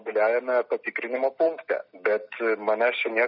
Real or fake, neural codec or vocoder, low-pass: real; none; 5.4 kHz